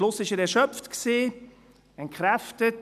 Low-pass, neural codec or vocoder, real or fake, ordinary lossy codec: 14.4 kHz; none; real; none